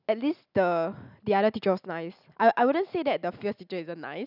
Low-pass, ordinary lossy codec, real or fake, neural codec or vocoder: 5.4 kHz; none; real; none